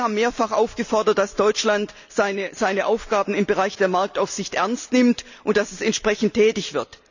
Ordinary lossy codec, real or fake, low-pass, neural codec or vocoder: none; real; 7.2 kHz; none